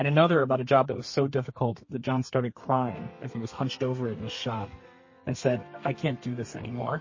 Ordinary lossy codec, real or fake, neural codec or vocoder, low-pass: MP3, 32 kbps; fake; codec, 32 kHz, 1.9 kbps, SNAC; 7.2 kHz